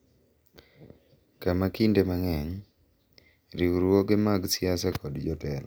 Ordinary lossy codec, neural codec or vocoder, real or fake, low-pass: none; none; real; none